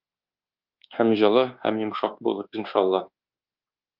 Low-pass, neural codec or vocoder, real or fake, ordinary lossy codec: 5.4 kHz; codec, 24 kHz, 1.2 kbps, DualCodec; fake; Opus, 32 kbps